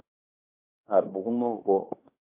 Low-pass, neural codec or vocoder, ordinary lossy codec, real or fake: 3.6 kHz; codec, 16 kHz in and 24 kHz out, 0.9 kbps, LongCat-Audio-Codec, fine tuned four codebook decoder; MP3, 32 kbps; fake